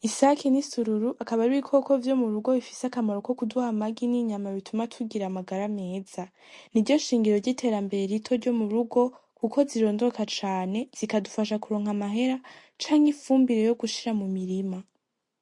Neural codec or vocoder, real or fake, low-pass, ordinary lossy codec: none; real; 10.8 kHz; MP3, 48 kbps